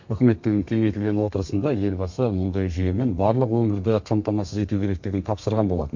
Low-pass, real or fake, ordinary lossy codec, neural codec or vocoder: 7.2 kHz; fake; MP3, 48 kbps; codec, 32 kHz, 1.9 kbps, SNAC